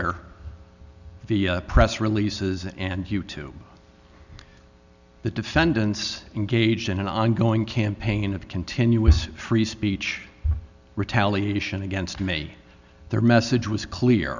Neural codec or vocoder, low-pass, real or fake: none; 7.2 kHz; real